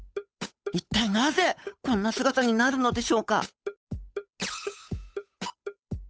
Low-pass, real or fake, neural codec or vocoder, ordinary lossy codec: none; fake; codec, 16 kHz, 8 kbps, FunCodec, trained on Chinese and English, 25 frames a second; none